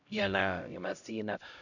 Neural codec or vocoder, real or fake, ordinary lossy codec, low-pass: codec, 16 kHz, 0.5 kbps, X-Codec, HuBERT features, trained on LibriSpeech; fake; none; 7.2 kHz